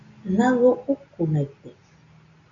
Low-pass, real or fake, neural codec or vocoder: 7.2 kHz; real; none